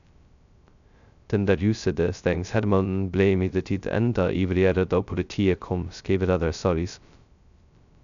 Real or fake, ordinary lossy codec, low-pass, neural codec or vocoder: fake; none; 7.2 kHz; codec, 16 kHz, 0.2 kbps, FocalCodec